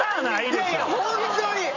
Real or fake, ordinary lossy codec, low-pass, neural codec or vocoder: fake; none; 7.2 kHz; vocoder, 44.1 kHz, 128 mel bands every 256 samples, BigVGAN v2